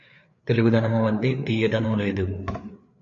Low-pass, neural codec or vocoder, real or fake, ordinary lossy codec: 7.2 kHz; codec, 16 kHz, 4 kbps, FreqCodec, larger model; fake; AAC, 64 kbps